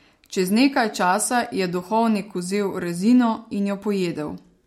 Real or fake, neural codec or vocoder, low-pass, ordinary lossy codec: real; none; 19.8 kHz; MP3, 64 kbps